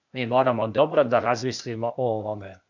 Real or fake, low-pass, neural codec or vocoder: fake; 7.2 kHz; codec, 16 kHz, 0.8 kbps, ZipCodec